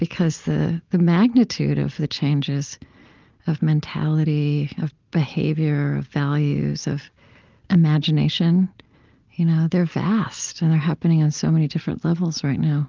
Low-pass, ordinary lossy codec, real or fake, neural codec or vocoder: 7.2 kHz; Opus, 32 kbps; real; none